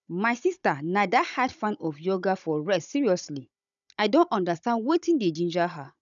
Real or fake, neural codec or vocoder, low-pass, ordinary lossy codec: fake; codec, 16 kHz, 16 kbps, FunCodec, trained on Chinese and English, 50 frames a second; 7.2 kHz; none